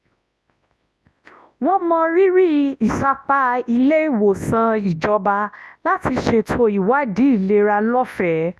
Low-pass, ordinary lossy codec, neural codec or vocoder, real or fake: none; none; codec, 24 kHz, 0.9 kbps, WavTokenizer, large speech release; fake